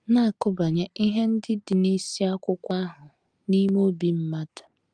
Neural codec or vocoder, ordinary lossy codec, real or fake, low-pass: none; Opus, 32 kbps; real; 9.9 kHz